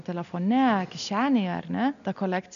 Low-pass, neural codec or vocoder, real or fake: 7.2 kHz; none; real